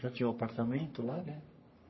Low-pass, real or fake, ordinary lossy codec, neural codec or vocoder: 7.2 kHz; fake; MP3, 24 kbps; codec, 44.1 kHz, 3.4 kbps, Pupu-Codec